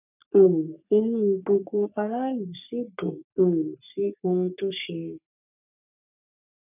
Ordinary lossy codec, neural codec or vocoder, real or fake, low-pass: none; codec, 44.1 kHz, 3.4 kbps, Pupu-Codec; fake; 3.6 kHz